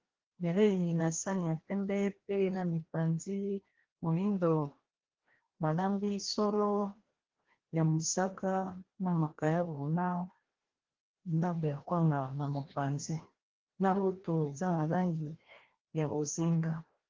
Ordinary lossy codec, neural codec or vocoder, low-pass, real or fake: Opus, 16 kbps; codec, 16 kHz, 1 kbps, FreqCodec, larger model; 7.2 kHz; fake